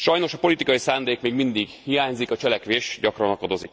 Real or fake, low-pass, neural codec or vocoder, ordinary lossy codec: real; none; none; none